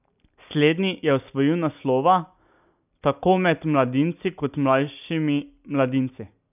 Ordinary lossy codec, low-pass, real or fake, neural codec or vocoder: none; 3.6 kHz; real; none